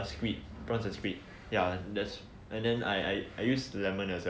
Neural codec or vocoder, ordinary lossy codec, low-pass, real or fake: none; none; none; real